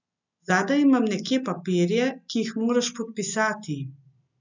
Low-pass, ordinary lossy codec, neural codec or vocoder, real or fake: 7.2 kHz; none; none; real